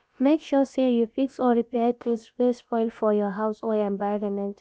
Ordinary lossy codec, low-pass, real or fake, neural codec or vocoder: none; none; fake; codec, 16 kHz, about 1 kbps, DyCAST, with the encoder's durations